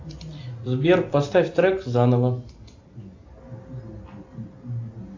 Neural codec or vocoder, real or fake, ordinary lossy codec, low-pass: none; real; AAC, 48 kbps; 7.2 kHz